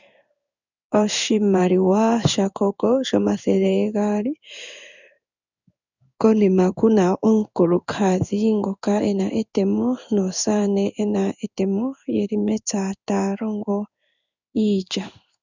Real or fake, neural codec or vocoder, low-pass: fake; codec, 16 kHz in and 24 kHz out, 1 kbps, XY-Tokenizer; 7.2 kHz